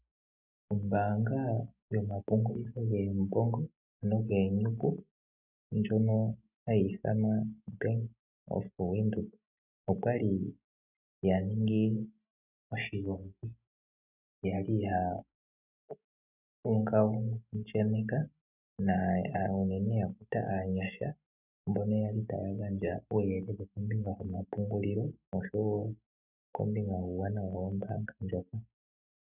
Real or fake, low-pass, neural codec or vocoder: real; 3.6 kHz; none